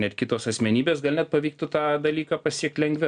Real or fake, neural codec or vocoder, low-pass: real; none; 9.9 kHz